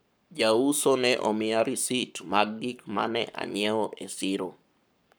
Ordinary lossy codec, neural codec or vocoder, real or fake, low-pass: none; codec, 44.1 kHz, 7.8 kbps, Pupu-Codec; fake; none